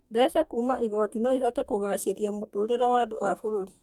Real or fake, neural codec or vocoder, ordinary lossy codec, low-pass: fake; codec, 44.1 kHz, 2.6 kbps, DAC; none; 19.8 kHz